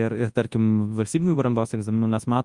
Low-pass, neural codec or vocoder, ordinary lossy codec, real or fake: 10.8 kHz; codec, 24 kHz, 0.9 kbps, WavTokenizer, large speech release; Opus, 32 kbps; fake